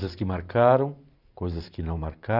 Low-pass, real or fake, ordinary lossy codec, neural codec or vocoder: 5.4 kHz; fake; none; vocoder, 44.1 kHz, 128 mel bands every 512 samples, BigVGAN v2